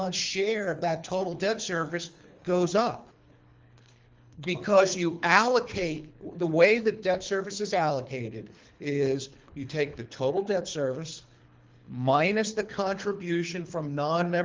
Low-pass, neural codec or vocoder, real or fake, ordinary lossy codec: 7.2 kHz; codec, 24 kHz, 3 kbps, HILCodec; fake; Opus, 32 kbps